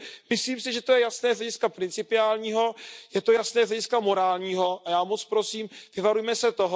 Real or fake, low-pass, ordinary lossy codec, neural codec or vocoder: real; none; none; none